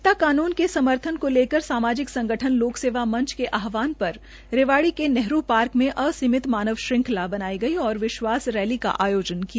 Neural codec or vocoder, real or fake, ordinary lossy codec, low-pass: none; real; none; none